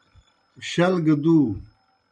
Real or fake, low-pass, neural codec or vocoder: real; 9.9 kHz; none